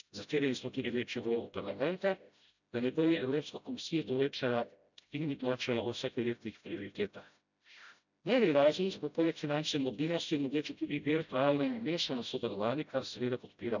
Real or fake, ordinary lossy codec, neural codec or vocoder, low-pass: fake; none; codec, 16 kHz, 0.5 kbps, FreqCodec, smaller model; 7.2 kHz